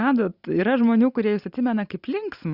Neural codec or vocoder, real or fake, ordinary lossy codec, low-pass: none; real; Opus, 64 kbps; 5.4 kHz